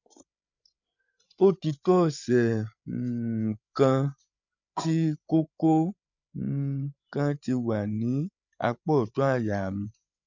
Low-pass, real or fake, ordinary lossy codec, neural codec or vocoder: 7.2 kHz; fake; none; codec, 16 kHz, 8 kbps, FreqCodec, larger model